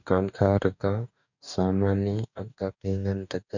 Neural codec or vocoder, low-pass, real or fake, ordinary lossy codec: codec, 44.1 kHz, 2.6 kbps, DAC; 7.2 kHz; fake; none